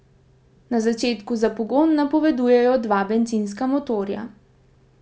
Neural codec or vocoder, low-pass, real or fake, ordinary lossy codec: none; none; real; none